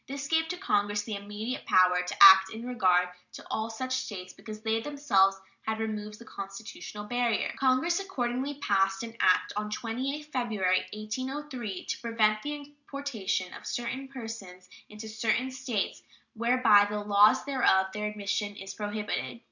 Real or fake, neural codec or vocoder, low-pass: real; none; 7.2 kHz